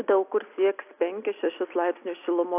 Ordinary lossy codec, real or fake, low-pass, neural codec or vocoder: AAC, 32 kbps; real; 3.6 kHz; none